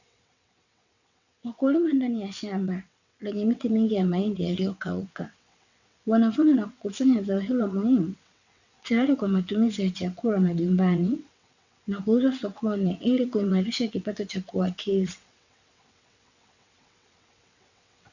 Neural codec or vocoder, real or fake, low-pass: vocoder, 22.05 kHz, 80 mel bands, WaveNeXt; fake; 7.2 kHz